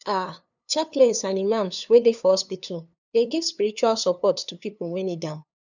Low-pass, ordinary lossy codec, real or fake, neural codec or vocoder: 7.2 kHz; none; fake; codec, 16 kHz, 2 kbps, FunCodec, trained on LibriTTS, 25 frames a second